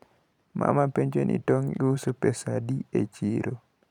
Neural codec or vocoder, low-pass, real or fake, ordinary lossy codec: vocoder, 44.1 kHz, 128 mel bands every 512 samples, BigVGAN v2; 19.8 kHz; fake; none